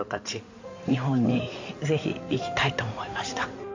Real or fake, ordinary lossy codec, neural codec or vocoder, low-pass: fake; AAC, 48 kbps; codec, 16 kHz in and 24 kHz out, 2.2 kbps, FireRedTTS-2 codec; 7.2 kHz